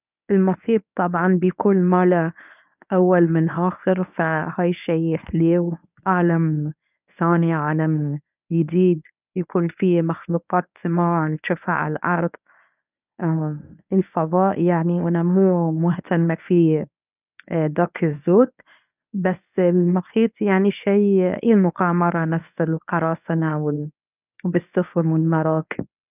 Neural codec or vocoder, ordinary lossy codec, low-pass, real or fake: codec, 24 kHz, 0.9 kbps, WavTokenizer, medium speech release version 1; none; 3.6 kHz; fake